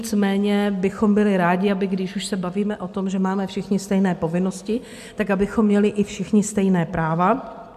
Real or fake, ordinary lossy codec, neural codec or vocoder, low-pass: real; MP3, 96 kbps; none; 14.4 kHz